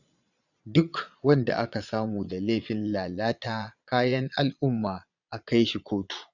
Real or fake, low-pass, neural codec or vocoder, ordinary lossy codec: fake; 7.2 kHz; vocoder, 22.05 kHz, 80 mel bands, Vocos; none